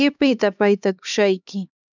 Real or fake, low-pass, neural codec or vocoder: fake; 7.2 kHz; codec, 16 kHz, 2 kbps, X-Codec, HuBERT features, trained on LibriSpeech